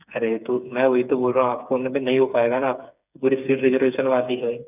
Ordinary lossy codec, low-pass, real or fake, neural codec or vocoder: none; 3.6 kHz; fake; codec, 16 kHz, 4 kbps, FreqCodec, smaller model